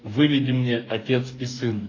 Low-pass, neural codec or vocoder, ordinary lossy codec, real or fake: 7.2 kHz; codec, 44.1 kHz, 2.6 kbps, DAC; AAC, 32 kbps; fake